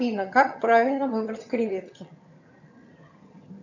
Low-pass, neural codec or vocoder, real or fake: 7.2 kHz; vocoder, 22.05 kHz, 80 mel bands, HiFi-GAN; fake